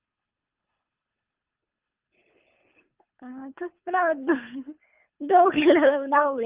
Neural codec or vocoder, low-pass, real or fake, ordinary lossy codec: codec, 24 kHz, 3 kbps, HILCodec; 3.6 kHz; fake; Opus, 24 kbps